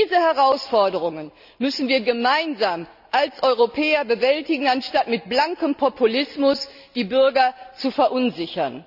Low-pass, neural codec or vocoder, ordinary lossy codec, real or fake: 5.4 kHz; none; none; real